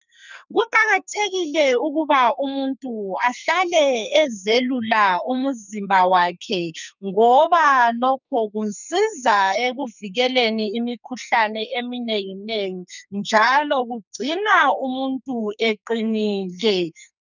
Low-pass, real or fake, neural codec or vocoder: 7.2 kHz; fake; codec, 44.1 kHz, 2.6 kbps, SNAC